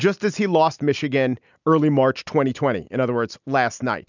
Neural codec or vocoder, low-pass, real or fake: none; 7.2 kHz; real